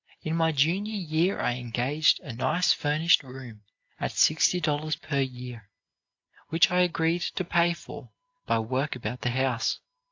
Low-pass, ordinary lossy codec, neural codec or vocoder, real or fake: 7.2 kHz; AAC, 48 kbps; none; real